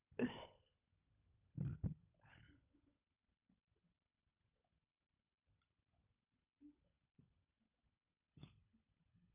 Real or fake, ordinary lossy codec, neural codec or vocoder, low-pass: fake; none; codec, 16 kHz, 16 kbps, FunCodec, trained on LibriTTS, 50 frames a second; 3.6 kHz